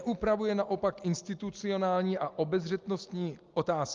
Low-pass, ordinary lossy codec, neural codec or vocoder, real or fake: 7.2 kHz; Opus, 32 kbps; none; real